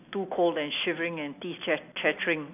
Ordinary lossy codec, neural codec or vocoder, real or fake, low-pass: none; none; real; 3.6 kHz